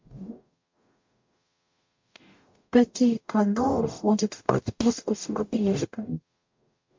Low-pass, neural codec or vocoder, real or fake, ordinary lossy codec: 7.2 kHz; codec, 44.1 kHz, 0.9 kbps, DAC; fake; MP3, 48 kbps